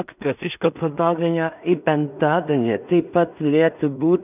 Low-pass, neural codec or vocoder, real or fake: 3.6 kHz; codec, 16 kHz in and 24 kHz out, 0.4 kbps, LongCat-Audio-Codec, two codebook decoder; fake